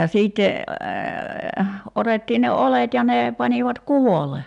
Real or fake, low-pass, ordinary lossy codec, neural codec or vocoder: real; 10.8 kHz; none; none